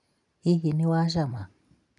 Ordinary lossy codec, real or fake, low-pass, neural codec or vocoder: none; real; 10.8 kHz; none